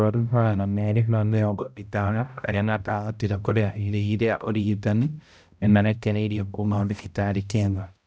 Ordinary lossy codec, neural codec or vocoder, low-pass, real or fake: none; codec, 16 kHz, 0.5 kbps, X-Codec, HuBERT features, trained on balanced general audio; none; fake